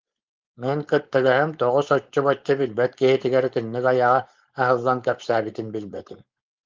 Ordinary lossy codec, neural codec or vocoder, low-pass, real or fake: Opus, 32 kbps; codec, 16 kHz, 4.8 kbps, FACodec; 7.2 kHz; fake